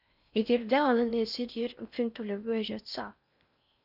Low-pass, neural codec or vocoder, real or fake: 5.4 kHz; codec, 16 kHz in and 24 kHz out, 0.6 kbps, FocalCodec, streaming, 4096 codes; fake